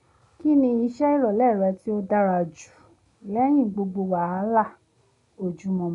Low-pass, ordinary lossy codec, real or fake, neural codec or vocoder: 10.8 kHz; none; real; none